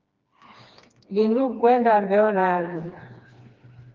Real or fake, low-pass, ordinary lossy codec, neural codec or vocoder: fake; 7.2 kHz; Opus, 32 kbps; codec, 16 kHz, 2 kbps, FreqCodec, smaller model